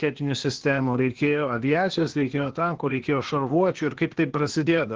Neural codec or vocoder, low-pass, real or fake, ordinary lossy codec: codec, 16 kHz, 0.8 kbps, ZipCodec; 7.2 kHz; fake; Opus, 16 kbps